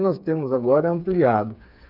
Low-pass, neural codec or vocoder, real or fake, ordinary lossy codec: 5.4 kHz; codec, 16 kHz, 4 kbps, FreqCodec, smaller model; fake; none